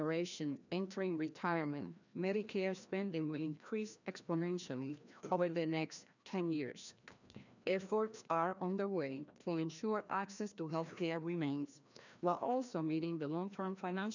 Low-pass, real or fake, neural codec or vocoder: 7.2 kHz; fake; codec, 16 kHz, 1 kbps, FreqCodec, larger model